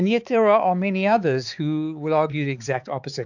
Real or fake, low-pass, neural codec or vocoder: fake; 7.2 kHz; codec, 16 kHz, 4 kbps, X-Codec, HuBERT features, trained on balanced general audio